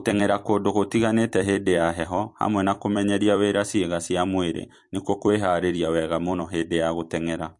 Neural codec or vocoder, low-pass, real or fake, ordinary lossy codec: vocoder, 44.1 kHz, 128 mel bands every 256 samples, BigVGAN v2; 10.8 kHz; fake; MP3, 48 kbps